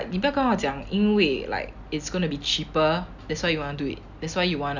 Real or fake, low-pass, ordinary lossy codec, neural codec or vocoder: real; 7.2 kHz; none; none